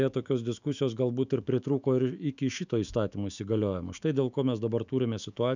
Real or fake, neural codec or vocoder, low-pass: fake; autoencoder, 48 kHz, 128 numbers a frame, DAC-VAE, trained on Japanese speech; 7.2 kHz